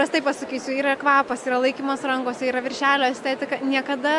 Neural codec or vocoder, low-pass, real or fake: none; 10.8 kHz; real